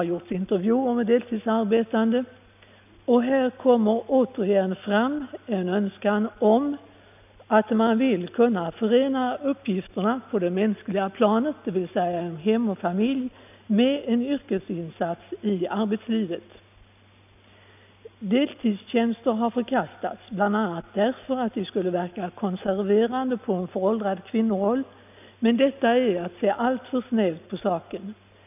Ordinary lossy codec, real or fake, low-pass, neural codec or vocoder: none; real; 3.6 kHz; none